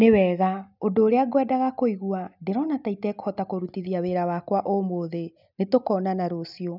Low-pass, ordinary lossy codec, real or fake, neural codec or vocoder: 5.4 kHz; none; real; none